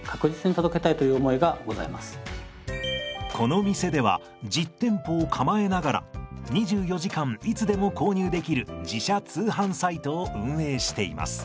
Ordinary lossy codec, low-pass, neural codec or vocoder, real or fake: none; none; none; real